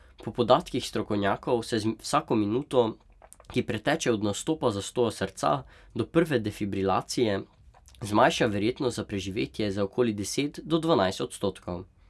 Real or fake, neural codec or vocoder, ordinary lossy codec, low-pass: real; none; none; none